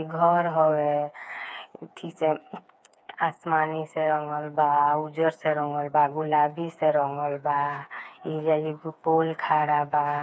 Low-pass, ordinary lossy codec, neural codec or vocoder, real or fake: none; none; codec, 16 kHz, 4 kbps, FreqCodec, smaller model; fake